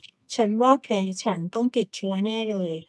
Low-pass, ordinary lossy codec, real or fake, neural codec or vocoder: none; none; fake; codec, 24 kHz, 0.9 kbps, WavTokenizer, medium music audio release